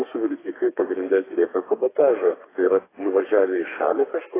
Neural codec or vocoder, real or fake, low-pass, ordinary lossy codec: codec, 32 kHz, 1.9 kbps, SNAC; fake; 3.6 kHz; AAC, 16 kbps